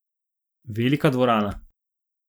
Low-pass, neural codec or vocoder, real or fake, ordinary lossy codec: none; none; real; none